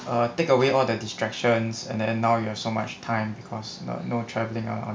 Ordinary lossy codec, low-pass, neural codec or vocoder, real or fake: none; none; none; real